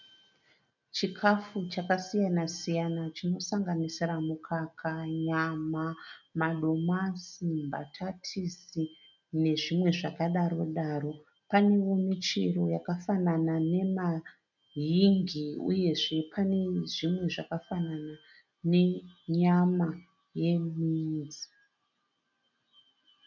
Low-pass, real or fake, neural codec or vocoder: 7.2 kHz; real; none